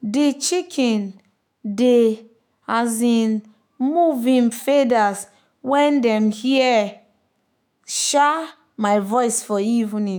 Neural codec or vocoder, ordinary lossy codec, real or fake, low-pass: autoencoder, 48 kHz, 128 numbers a frame, DAC-VAE, trained on Japanese speech; none; fake; none